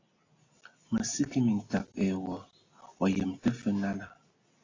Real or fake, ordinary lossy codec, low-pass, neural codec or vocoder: real; AAC, 32 kbps; 7.2 kHz; none